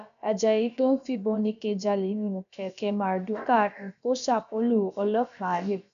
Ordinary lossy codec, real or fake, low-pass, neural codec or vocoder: none; fake; 7.2 kHz; codec, 16 kHz, about 1 kbps, DyCAST, with the encoder's durations